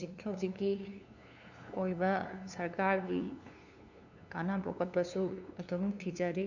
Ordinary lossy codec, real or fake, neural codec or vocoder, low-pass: none; fake; codec, 16 kHz, 2 kbps, FunCodec, trained on LibriTTS, 25 frames a second; 7.2 kHz